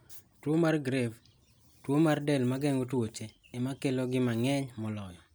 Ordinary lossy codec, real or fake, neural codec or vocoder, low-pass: none; real; none; none